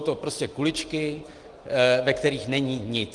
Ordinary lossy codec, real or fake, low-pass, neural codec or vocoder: Opus, 24 kbps; real; 10.8 kHz; none